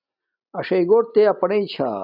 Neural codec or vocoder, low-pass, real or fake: none; 5.4 kHz; real